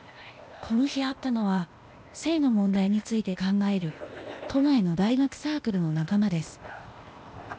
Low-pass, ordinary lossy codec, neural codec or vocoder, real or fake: none; none; codec, 16 kHz, 0.8 kbps, ZipCodec; fake